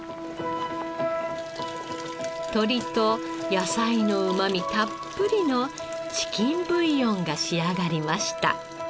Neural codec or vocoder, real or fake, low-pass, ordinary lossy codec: none; real; none; none